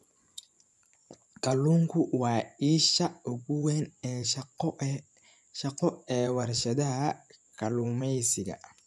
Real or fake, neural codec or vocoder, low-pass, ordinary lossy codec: fake; vocoder, 24 kHz, 100 mel bands, Vocos; none; none